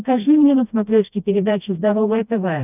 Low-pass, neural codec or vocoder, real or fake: 3.6 kHz; codec, 16 kHz, 1 kbps, FreqCodec, smaller model; fake